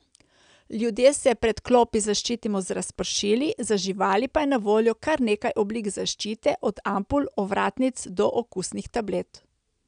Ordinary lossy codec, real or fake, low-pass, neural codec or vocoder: none; real; 9.9 kHz; none